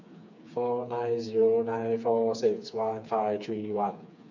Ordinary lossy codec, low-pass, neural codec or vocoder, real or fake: none; 7.2 kHz; codec, 16 kHz, 4 kbps, FreqCodec, smaller model; fake